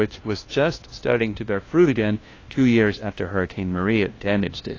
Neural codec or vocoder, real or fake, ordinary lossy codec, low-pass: codec, 16 kHz, 1 kbps, FunCodec, trained on LibriTTS, 50 frames a second; fake; AAC, 32 kbps; 7.2 kHz